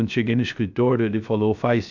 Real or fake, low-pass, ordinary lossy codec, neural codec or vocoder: fake; 7.2 kHz; none; codec, 16 kHz, 0.3 kbps, FocalCodec